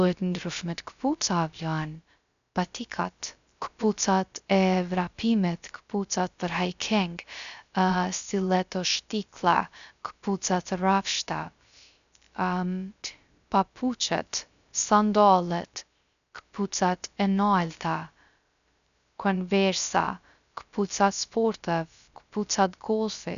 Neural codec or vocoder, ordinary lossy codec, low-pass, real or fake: codec, 16 kHz, 0.3 kbps, FocalCodec; none; 7.2 kHz; fake